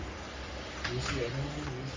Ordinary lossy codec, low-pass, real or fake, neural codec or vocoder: Opus, 32 kbps; 7.2 kHz; fake; codec, 44.1 kHz, 3.4 kbps, Pupu-Codec